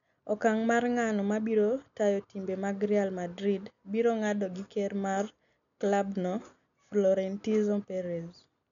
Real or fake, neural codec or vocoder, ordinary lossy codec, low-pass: real; none; none; 7.2 kHz